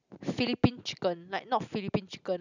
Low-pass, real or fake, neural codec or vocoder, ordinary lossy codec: 7.2 kHz; real; none; none